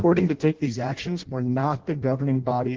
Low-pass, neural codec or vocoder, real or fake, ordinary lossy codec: 7.2 kHz; codec, 16 kHz in and 24 kHz out, 0.6 kbps, FireRedTTS-2 codec; fake; Opus, 16 kbps